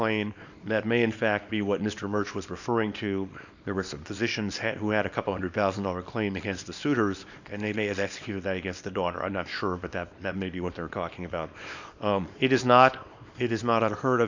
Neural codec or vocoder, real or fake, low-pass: codec, 24 kHz, 0.9 kbps, WavTokenizer, small release; fake; 7.2 kHz